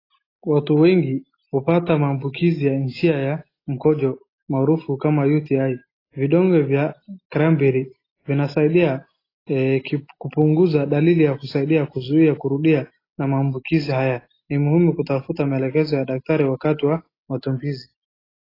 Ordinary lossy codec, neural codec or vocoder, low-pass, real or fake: AAC, 24 kbps; none; 5.4 kHz; real